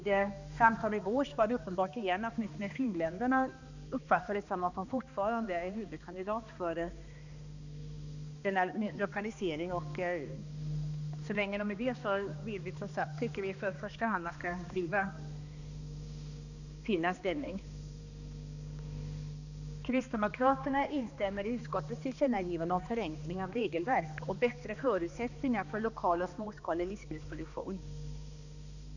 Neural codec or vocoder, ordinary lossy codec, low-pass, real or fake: codec, 16 kHz, 2 kbps, X-Codec, HuBERT features, trained on balanced general audio; none; 7.2 kHz; fake